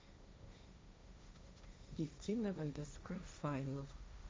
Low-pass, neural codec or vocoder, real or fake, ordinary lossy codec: none; codec, 16 kHz, 1.1 kbps, Voila-Tokenizer; fake; none